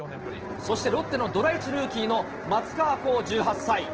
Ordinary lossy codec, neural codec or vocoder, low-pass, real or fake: Opus, 16 kbps; none; 7.2 kHz; real